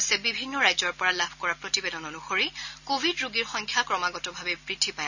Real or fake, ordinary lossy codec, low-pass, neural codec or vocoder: real; none; 7.2 kHz; none